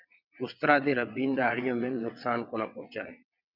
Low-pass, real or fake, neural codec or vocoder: 5.4 kHz; fake; vocoder, 22.05 kHz, 80 mel bands, WaveNeXt